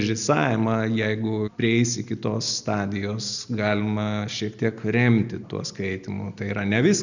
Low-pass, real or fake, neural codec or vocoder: 7.2 kHz; real; none